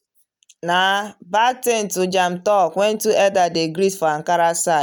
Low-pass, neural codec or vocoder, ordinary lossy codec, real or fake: none; none; none; real